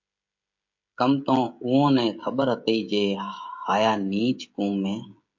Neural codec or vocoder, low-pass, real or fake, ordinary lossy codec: codec, 16 kHz, 16 kbps, FreqCodec, smaller model; 7.2 kHz; fake; MP3, 48 kbps